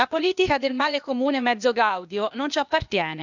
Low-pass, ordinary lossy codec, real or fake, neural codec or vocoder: 7.2 kHz; none; fake; codec, 16 kHz, 0.8 kbps, ZipCodec